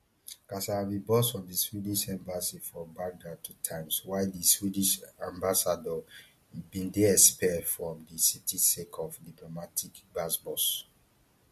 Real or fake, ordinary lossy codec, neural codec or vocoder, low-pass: real; MP3, 64 kbps; none; 14.4 kHz